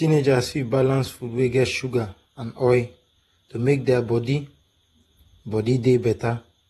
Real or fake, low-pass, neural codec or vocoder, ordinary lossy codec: fake; 19.8 kHz; vocoder, 48 kHz, 128 mel bands, Vocos; AAC, 32 kbps